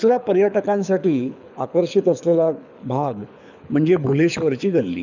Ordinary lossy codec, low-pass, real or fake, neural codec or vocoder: none; 7.2 kHz; fake; codec, 24 kHz, 6 kbps, HILCodec